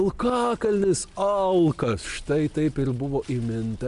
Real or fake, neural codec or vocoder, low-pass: real; none; 10.8 kHz